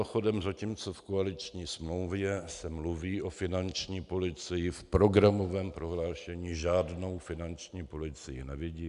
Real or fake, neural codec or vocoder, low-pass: real; none; 10.8 kHz